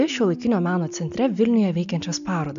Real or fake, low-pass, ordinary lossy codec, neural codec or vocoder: real; 7.2 kHz; MP3, 64 kbps; none